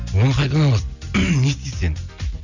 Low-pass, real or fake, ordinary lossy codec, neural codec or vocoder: 7.2 kHz; real; none; none